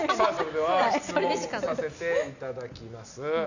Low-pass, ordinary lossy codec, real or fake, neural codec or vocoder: 7.2 kHz; none; real; none